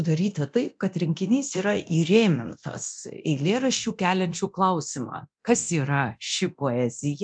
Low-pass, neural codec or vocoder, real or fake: 9.9 kHz; codec, 24 kHz, 0.9 kbps, DualCodec; fake